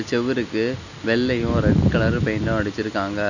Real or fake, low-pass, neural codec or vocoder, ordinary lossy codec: real; 7.2 kHz; none; none